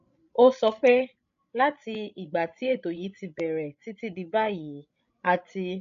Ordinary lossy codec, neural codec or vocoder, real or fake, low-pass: MP3, 96 kbps; codec, 16 kHz, 16 kbps, FreqCodec, larger model; fake; 7.2 kHz